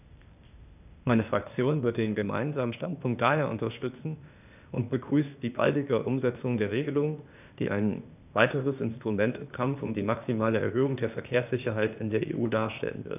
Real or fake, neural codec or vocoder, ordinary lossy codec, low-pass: fake; codec, 16 kHz, 0.8 kbps, ZipCodec; none; 3.6 kHz